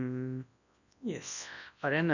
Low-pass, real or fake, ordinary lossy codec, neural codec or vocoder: 7.2 kHz; fake; none; codec, 24 kHz, 0.9 kbps, WavTokenizer, large speech release